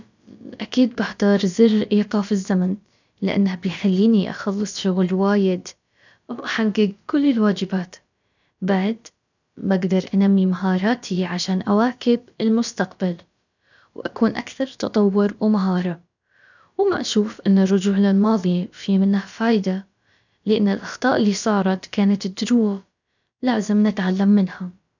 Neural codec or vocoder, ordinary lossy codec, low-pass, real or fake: codec, 16 kHz, about 1 kbps, DyCAST, with the encoder's durations; none; 7.2 kHz; fake